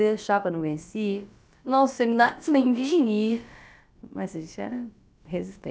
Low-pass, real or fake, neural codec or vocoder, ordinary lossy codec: none; fake; codec, 16 kHz, about 1 kbps, DyCAST, with the encoder's durations; none